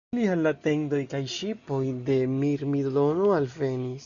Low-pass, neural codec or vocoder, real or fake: 7.2 kHz; none; real